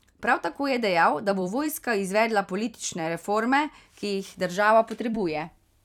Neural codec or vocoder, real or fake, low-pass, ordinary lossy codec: vocoder, 44.1 kHz, 128 mel bands every 256 samples, BigVGAN v2; fake; 19.8 kHz; none